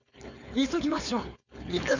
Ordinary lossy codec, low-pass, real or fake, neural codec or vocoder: none; 7.2 kHz; fake; codec, 16 kHz, 4.8 kbps, FACodec